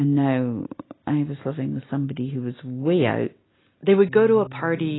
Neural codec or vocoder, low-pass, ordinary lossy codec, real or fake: none; 7.2 kHz; AAC, 16 kbps; real